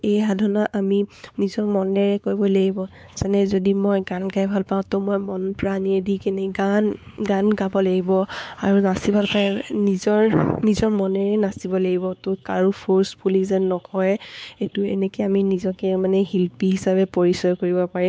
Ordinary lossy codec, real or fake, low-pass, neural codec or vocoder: none; fake; none; codec, 16 kHz, 4 kbps, X-Codec, WavLM features, trained on Multilingual LibriSpeech